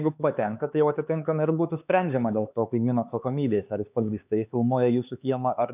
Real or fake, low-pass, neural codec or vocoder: fake; 3.6 kHz; codec, 16 kHz, 4 kbps, X-Codec, HuBERT features, trained on LibriSpeech